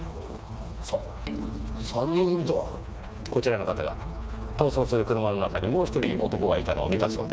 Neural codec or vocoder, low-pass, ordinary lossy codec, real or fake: codec, 16 kHz, 2 kbps, FreqCodec, smaller model; none; none; fake